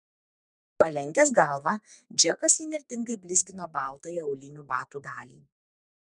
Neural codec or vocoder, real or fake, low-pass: codec, 32 kHz, 1.9 kbps, SNAC; fake; 10.8 kHz